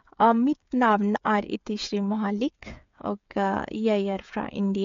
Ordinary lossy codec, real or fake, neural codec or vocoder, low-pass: MP3, 64 kbps; fake; codec, 16 kHz, 8 kbps, FreqCodec, smaller model; 7.2 kHz